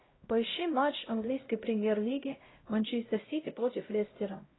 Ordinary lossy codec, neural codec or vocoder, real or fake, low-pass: AAC, 16 kbps; codec, 16 kHz, 0.5 kbps, X-Codec, HuBERT features, trained on LibriSpeech; fake; 7.2 kHz